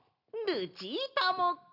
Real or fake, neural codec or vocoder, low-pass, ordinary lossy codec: real; none; 5.4 kHz; none